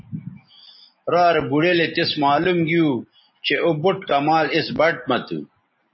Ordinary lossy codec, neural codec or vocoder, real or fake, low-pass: MP3, 24 kbps; none; real; 7.2 kHz